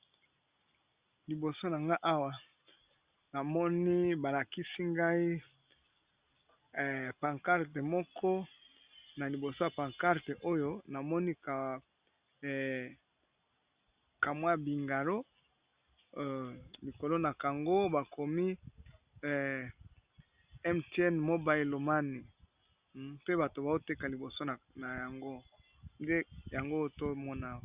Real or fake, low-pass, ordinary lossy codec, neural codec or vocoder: real; 3.6 kHz; Opus, 64 kbps; none